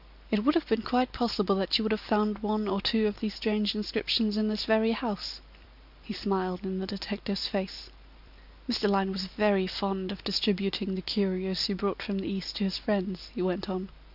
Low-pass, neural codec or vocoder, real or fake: 5.4 kHz; none; real